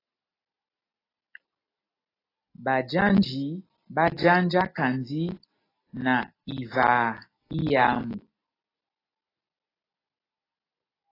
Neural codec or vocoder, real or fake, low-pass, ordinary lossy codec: none; real; 5.4 kHz; AAC, 24 kbps